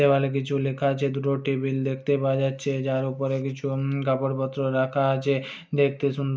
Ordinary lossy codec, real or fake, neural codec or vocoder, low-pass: none; real; none; none